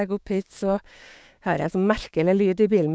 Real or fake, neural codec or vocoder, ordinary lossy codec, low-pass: fake; codec, 16 kHz, 6 kbps, DAC; none; none